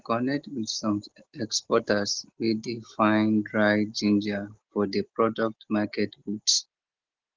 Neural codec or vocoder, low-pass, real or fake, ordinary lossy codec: none; 7.2 kHz; real; Opus, 16 kbps